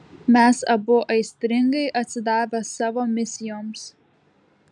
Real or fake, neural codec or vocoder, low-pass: real; none; 10.8 kHz